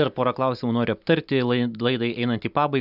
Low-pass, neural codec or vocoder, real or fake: 5.4 kHz; none; real